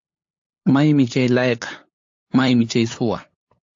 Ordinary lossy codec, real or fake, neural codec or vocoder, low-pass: AAC, 48 kbps; fake; codec, 16 kHz, 8 kbps, FunCodec, trained on LibriTTS, 25 frames a second; 7.2 kHz